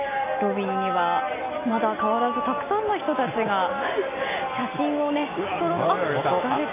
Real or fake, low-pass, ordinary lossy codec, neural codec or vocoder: real; 3.6 kHz; MP3, 24 kbps; none